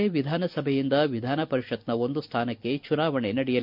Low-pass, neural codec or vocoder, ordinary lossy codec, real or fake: 5.4 kHz; none; none; real